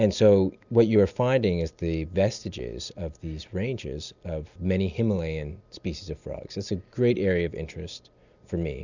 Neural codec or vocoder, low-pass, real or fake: none; 7.2 kHz; real